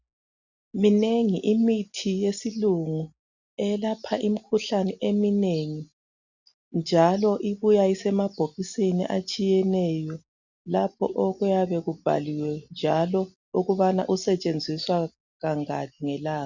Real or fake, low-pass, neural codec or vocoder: real; 7.2 kHz; none